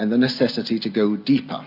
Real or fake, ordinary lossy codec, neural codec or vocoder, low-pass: real; MP3, 48 kbps; none; 5.4 kHz